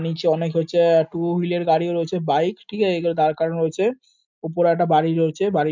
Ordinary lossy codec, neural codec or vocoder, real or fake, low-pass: MP3, 64 kbps; none; real; 7.2 kHz